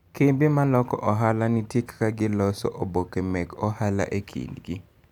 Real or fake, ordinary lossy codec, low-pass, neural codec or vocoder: real; none; 19.8 kHz; none